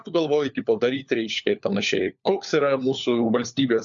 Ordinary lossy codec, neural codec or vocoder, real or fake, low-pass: MP3, 96 kbps; codec, 16 kHz, 4 kbps, FunCodec, trained on LibriTTS, 50 frames a second; fake; 7.2 kHz